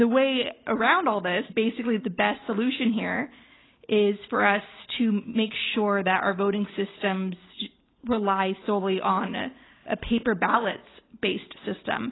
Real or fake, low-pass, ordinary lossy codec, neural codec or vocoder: real; 7.2 kHz; AAC, 16 kbps; none